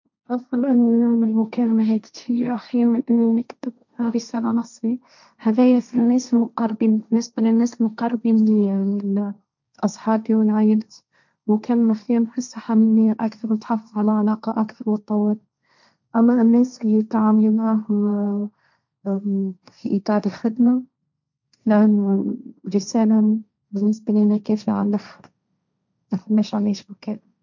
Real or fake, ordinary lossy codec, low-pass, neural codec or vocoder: fake; none; 7.2 kHz; codec, 16 kHz, 1.1 kbps, Voila-Tokenizer